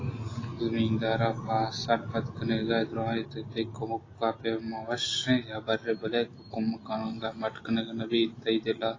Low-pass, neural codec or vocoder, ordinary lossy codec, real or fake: 7.2 kHz; none; AAC, 32 kbps; real